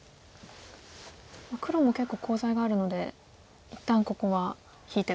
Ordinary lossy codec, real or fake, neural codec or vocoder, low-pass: none; real; none; none